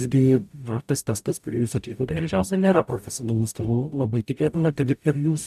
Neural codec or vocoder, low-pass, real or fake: codec, 44.1 kHz, 0.9 kbps, DAC; 14.4 kHz; fake